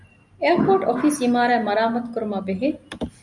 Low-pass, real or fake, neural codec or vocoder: 10.8 kHz; real; none